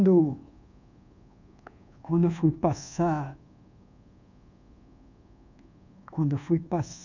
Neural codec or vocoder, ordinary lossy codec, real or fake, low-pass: codec, 24 kHz, 1.2 kbps, DualCodec; none; fake; 7.2 kHz